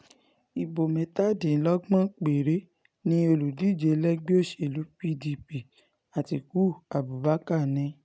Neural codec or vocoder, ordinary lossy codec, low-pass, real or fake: none; none; none; real